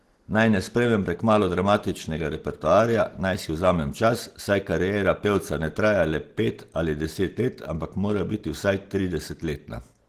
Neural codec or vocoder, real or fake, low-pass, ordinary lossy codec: none; real; 14.4 kHz; Opus, 16 kbps